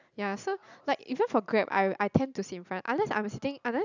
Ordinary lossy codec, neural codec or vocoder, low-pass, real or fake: none; none; 7.2 kHz; real